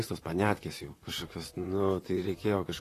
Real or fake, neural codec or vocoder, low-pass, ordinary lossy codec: fake; vocoder, 44.1 kHz, 128 mel bands, Pupu-Vocoder; 14.4 kHz; AAC, 48 kbps